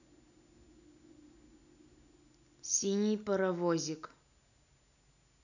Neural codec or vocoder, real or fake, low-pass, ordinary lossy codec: none; real; 7.2 kHz; none